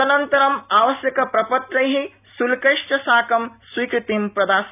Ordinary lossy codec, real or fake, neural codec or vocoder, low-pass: none; real; none; 3.6 kHz